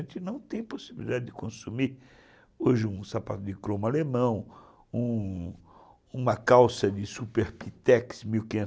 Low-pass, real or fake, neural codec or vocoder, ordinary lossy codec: none; real; none; none